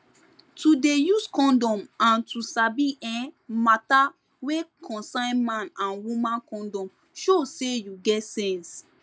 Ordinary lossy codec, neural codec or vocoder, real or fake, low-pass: none; none; real; none